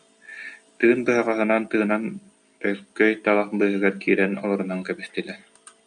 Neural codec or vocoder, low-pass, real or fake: none; 9.9 kHz; real